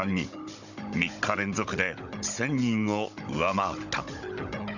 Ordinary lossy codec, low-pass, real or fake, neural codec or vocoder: none; 7.2 kHz; fake; codec, 16 kHz, 16 kbps, FunCodec, trained on Chinese and English, 50 frames a second